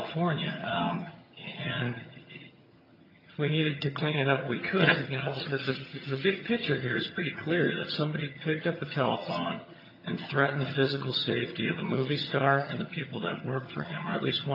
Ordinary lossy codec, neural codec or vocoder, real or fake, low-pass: AAC, 32 kbps; vocoder, 22.05 kHz, 80 mel bands, HiFi-GAN; fake; 5.4 kHz